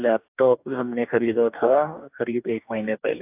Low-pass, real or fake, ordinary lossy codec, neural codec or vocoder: 3.6 kHz; fake; AAC, 32 kbps; codec, 44.1 kHz, 2.6 kbps, DAC